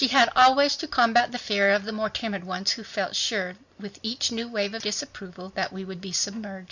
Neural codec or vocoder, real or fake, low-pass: none; real; 7.2 kHz